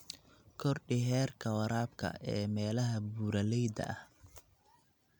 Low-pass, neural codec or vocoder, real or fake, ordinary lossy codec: 19.8 kHz; none; real; none